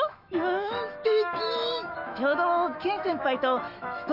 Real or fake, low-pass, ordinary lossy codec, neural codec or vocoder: fake; 5.4 kHz; none; autoencoder, 48 kHz, 128 numbers a frame, DAC-VAE, trained on Japanese speech